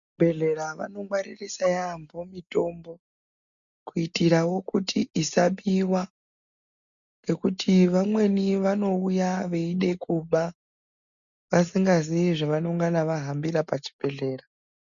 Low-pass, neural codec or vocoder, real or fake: 7.2 kHz; none; real